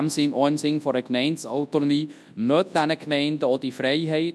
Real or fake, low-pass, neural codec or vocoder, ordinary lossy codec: fake; none; codec, 24 kHz, 0.9 kbps, WavTokenizer, large speech release; none